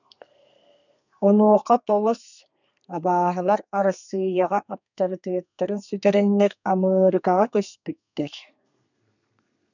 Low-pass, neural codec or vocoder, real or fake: 7.2 kHz; codec, 32 kHz, 1.9 kbps, SNAC; fake